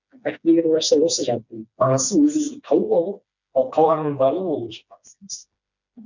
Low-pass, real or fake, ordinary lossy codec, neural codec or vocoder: 7.2 kHz; fake; none; codec, 16 kHz, 2 kbps, FreqCodec, smaller model